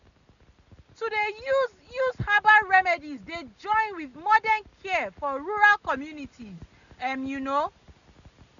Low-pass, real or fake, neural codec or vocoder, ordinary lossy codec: 7.2 kHz; real; none; none